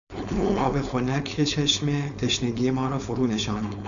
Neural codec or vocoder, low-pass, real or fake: codec, 16 kHz, 4.8 kbps, FACodec; 7.2 kHz; fake